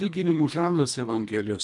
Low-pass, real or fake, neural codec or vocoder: 10.8 kHz; fake; codec, 24 kHz, 1.5 kbps, HILCodec